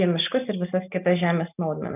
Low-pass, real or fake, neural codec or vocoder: 3.6 kHz; real; none